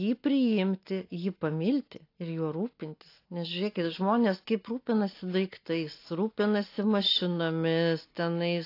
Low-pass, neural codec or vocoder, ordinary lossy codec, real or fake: 5.4 kHz; none; AAC, 32 kbps; real